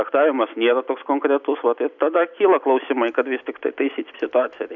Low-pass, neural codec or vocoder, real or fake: 7.2 kHz; none; real